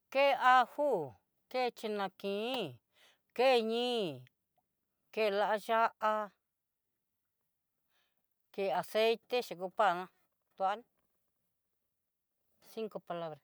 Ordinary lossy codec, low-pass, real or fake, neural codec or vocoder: none; none; real; none